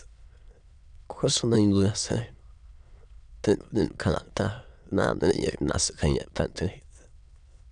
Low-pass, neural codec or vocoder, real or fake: 9.9 kHz; autoencoder, 22.05 kHz, a latent of 192 numbers a frame, VITS, trained on many speakers; fake